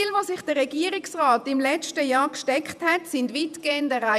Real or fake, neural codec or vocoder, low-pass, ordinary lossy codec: fake; vocoder, 48 kHz, 128 mel bands, Vocos; 14.4 kHz; none